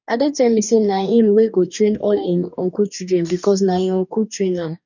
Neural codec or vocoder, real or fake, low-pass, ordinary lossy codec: codec, 44.1 kHz, 2.6 kbps, DAC; fake; 7.2 kHz; none